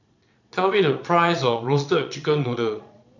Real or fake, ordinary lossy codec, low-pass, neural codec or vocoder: fake; none; 7.2 kHz; vocoder, 22.05 kHz, 80 mel bands, Vocos